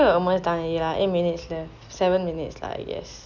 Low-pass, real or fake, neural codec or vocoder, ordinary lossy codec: 7.2 kHz; real; none; none